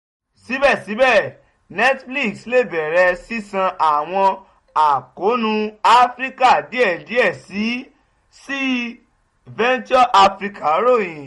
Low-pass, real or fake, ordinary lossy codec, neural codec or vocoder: 19.8 kHz; real; MP3, 48 kbps; none